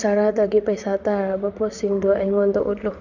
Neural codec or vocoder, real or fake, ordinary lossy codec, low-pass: vocoder, 44.1 kHz, 128 mel bands, Pupu-Vocoder; fake; none; 7.2 kHz